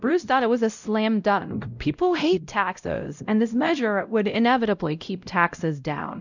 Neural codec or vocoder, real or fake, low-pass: codec, 16 kHz, 0.5 kbps, X-Codec, WavLM features, trained on Multilingual LibriSpeech; fake; 7.2 kHz